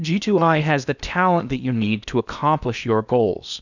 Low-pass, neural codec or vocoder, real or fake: 7.2 kHz; codec, 16 kHz in and 24 kHz out, 0.8 kbps, FocalCodec, streaming, 65536 codes; fake